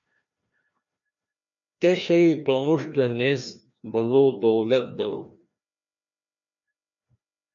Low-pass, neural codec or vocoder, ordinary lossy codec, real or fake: 7.2 kHz; codec, 16 kHz, 1 kbps, FreqCodec, larger model; MP3, 48 kbps; fake